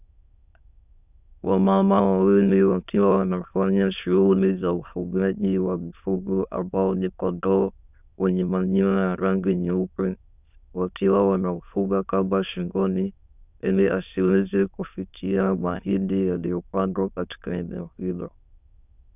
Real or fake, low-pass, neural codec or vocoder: fake; 3.6 kHz; autoencoder, 22.05 kHz, a latent of 192 numbers a frame, VITS, trained on many speakers